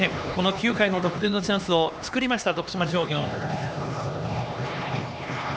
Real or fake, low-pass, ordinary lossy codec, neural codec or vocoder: fake; none; none; codec, 16 kHz, 2 kbps, X-Codec, HuBERT features, trained on LibriSpeech